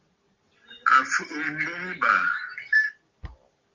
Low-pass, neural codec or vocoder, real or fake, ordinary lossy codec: 7.2 kHz; none; real; Opus, 32 kbps